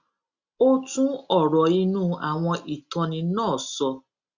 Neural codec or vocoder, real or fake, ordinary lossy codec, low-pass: none; real; Opus, 64 kbps; 7.2 kHz